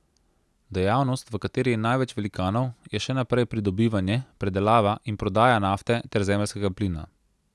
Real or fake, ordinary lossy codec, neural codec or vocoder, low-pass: real; none; none; none